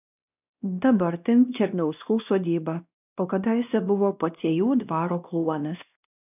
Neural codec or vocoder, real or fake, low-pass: codec, 16 kHz, 1 kbps, X-Codec, WavLM features, trained on Multilingual LibriSpeech; fake; 3.6 kHz